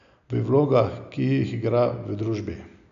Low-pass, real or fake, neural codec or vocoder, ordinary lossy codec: 7.2 kHz; real; none; none